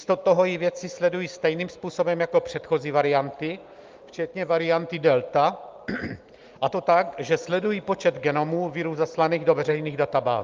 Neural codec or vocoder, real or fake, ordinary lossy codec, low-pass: none; real; Opus, 24 kbps; 7.2 kHz